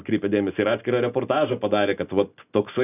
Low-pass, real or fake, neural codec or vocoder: 3.6 kHz; real; none